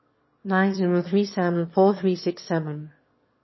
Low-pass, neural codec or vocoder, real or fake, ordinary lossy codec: 7.2 kHz; autoencoder, 22.05 kHz, a latent of 192 numbers a frame, VITS, trained on one speaker; fake; MP3, 24 kbps